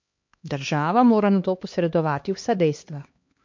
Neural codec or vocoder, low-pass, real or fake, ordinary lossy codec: codec, 16 kHz, 2 kbps, X-Codec, HuBERT features, trained on LibriSpeech; 7.2 kHz; fake; MP3, 48 kbps